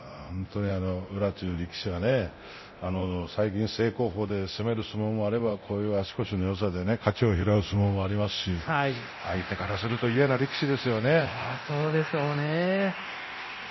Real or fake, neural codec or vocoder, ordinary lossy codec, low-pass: fake; codec, 24 kHz, 0.9 kbps, DualCodec; MP3, 24 kbps; 7.2 kHz